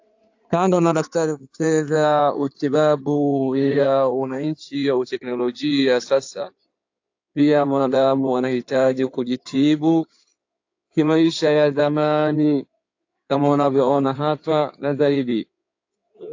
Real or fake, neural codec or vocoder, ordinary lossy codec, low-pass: fake; codec, 16 kHz in and 24 kHz out, 2.2 kbps, FireRedTTS-2 codec; AAC, 48 kbps; 7.2 kHz